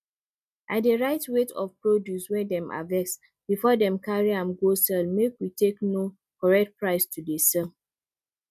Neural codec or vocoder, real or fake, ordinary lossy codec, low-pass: none; real; none; 14.4 kHz